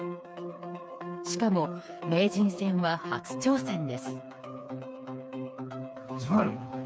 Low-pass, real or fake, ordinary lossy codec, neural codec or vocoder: none; fake; none; codec, 16 kHz, 4 kbps, FreqCodec, smaller model